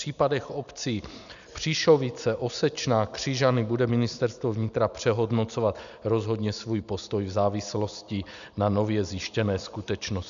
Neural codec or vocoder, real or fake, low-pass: none; real; 7.2 kHz